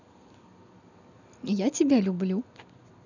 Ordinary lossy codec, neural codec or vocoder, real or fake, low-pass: none; none; real; 7.2 kHz